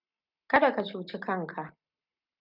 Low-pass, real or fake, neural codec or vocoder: 5.4 kHz; real; none